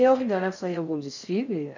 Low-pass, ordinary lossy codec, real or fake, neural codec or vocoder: 7.2 kHz; none; fake; codec, 16 kHz in and 24 kHz out, 0.6 kbps, FocalCodec, streaming, 4096 codes